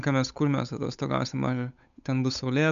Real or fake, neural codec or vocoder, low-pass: fake; codec, 16 kHz, 8 kbps, FunCodec, trained on Chinese and English, 25 frames a second; 7.2 kHz